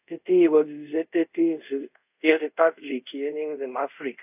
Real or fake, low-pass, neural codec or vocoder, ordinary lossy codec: fake; 3.6 kHz; codec, 24 kHz, 0.5 kbps, DualCodec; AAC, 32 kbps